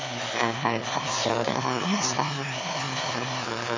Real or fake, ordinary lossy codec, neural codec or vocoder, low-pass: fake; MP3, 32 kbps; autoencoder, 22.05 kHz, a latent of 192 numbers a frame, VITS, trained on one speaker; 7.2 kHz